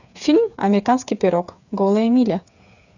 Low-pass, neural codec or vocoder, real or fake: 7.2 kHz; codec, 16 kHz, 8 kbps, FreqCodec, smaller model; fake